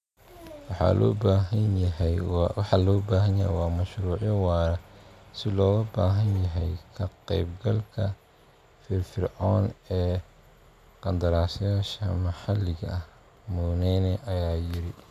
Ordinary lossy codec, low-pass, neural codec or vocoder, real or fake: none; 14.4 kHz; none; real